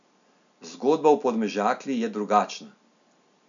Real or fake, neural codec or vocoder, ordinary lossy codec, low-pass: real; none; none; 7.2 kHz